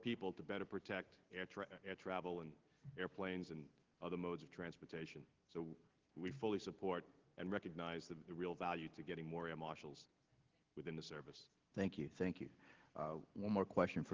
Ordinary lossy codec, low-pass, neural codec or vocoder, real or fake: Opus, 16 kbps; 7.2 kHz; none; real